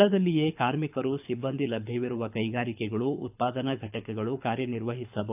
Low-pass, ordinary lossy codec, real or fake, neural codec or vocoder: 3.6 kHz; AAC, 32 kbps; fake; codec, 24 kHz, 6 kbps, HILCodec